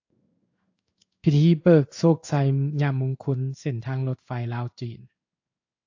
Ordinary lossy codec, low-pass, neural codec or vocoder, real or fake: AAC, 48 kbps; 7.2 kHz; codec, 16 kHz in and 24 kHz out, 1 kbps, XY-Tokenizer; fake